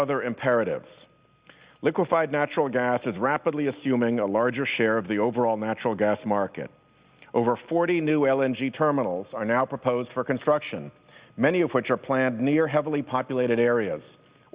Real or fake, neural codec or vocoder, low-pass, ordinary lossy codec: real; none; 3.6 kHz; Opus, 64 kbps